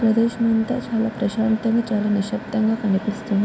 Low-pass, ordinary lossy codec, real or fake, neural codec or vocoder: none; none; real; none